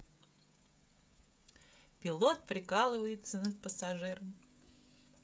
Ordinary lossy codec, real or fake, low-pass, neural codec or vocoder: none; fake; none; codec, 16 kHz, 16 kbps, FreqCodec, smaller model